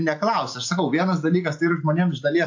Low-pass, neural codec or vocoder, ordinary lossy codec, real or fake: 7.2 kHz; none; AAC, 48 kbps; real